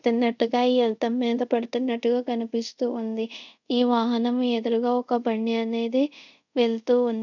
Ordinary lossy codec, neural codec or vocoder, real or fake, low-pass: none; codec, 24 kHz, 0.5 kbps, DualCodec; fake; 7.2 kHz